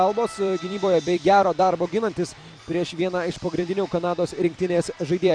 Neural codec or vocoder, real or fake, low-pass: vocoder, 44.1 kHz, 128 mel bands every 256 samples, BigVGAN v2; fake; 9.9 kHz